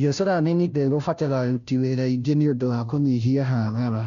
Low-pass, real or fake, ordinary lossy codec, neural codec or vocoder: 7.2 kHz; fake; none; codec, 16 kHz, 0.5 kbps, FunCodec, trained on Chinese and English, 25 frames a second